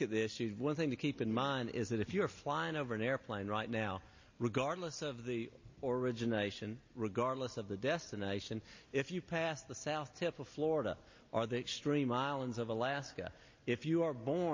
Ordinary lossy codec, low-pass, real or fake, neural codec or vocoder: MP3, 32 kbps; 7.2 kHz; real; none